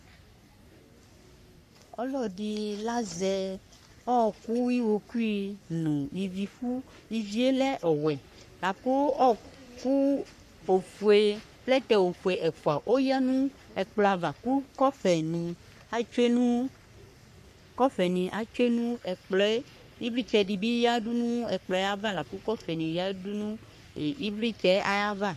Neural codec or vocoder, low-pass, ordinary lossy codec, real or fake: codec, 44.1 kHz, 3.4 kbps, Pupu-Codec; 14.4 kHz; MP3, 64 kbps; fake